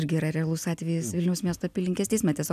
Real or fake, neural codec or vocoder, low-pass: real; none; 14.4 kHz